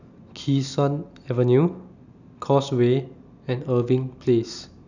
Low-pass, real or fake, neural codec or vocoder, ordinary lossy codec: 7.2 kHz; real; none; none